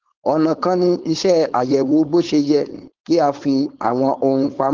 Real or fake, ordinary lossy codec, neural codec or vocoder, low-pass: fake; Opus, 16 kbps; codec, 16 kHz, 4.8 kbps, FACodec; 7.2 kHz